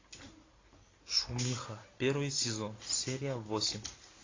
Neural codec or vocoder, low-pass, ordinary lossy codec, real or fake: none; 7.2 kHz; AAC, 32 kbps; real